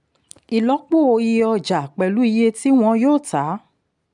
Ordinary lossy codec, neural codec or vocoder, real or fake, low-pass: none; none; real; 10.8 kHz